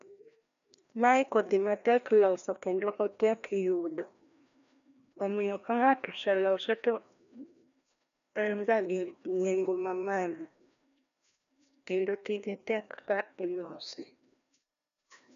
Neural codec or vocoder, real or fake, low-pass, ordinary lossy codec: codec, 16 kHz, 1 kbps, FreqCodec, larger model; fake; 7.2 kHz; none